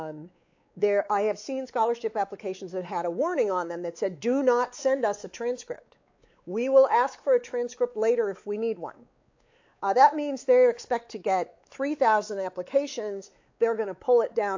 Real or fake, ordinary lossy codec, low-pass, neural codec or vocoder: fake; AAC, 48 kbps; 7.2 kHz; codec, 16 kHz, 4 kbps, X-Codec, WavLM features, trained on Multilingual LibriSpeech